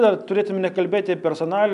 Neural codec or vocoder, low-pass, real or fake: none; 10.8 kHz; real